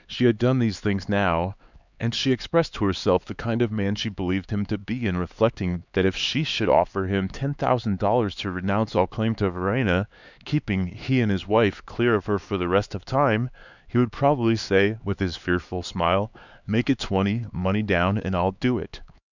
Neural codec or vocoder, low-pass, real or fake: codec, 16 kHz, 4 kbps, X-Codec, HuBERT features, trained on LibriSpeech; 7.2 kHz; fake